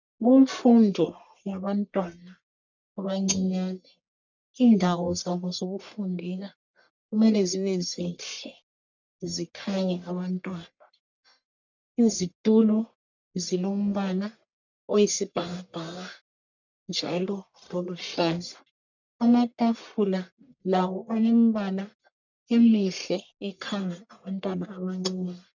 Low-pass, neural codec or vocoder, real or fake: 7.2 kHz; codec, 44.1 kHz, 1.7 kbps, Pupu-Codec; fake